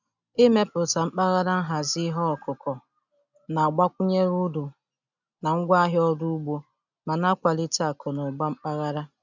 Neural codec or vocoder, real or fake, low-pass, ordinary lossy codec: none; real; 7.2 kHz; none